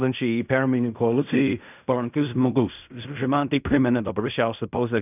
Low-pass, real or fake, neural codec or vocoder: 3.6 kHz; fake; codec, 16 kHz in and 24 kHz out, 0.4 kbps, LongCat-Audio-Codec, fine tuned four codebook decoder